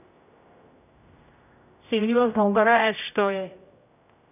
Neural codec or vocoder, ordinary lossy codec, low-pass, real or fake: codec, 16 kHz, 0.5 kbps, X-Codec, HuBERT features, trained on general audio; none; 3.6 kHz; fake